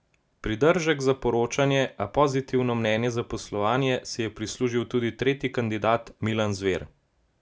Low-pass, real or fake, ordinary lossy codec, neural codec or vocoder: none; real; none; none